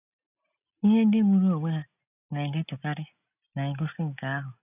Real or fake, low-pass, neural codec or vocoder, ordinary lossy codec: real; 3.6 kHz; none; none